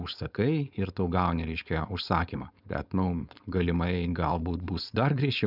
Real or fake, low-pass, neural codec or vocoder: fake; 5.4 kHz; codec, 16 kHz, 4.8 kbps, FACodec